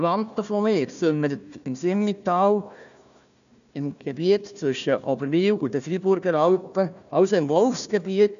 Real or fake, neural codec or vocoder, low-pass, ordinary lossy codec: fake; codec, 16 kHz, 1 kbps, FunCodec, trained on Chinese and English, 50 frames a second; 7.2 kHz; none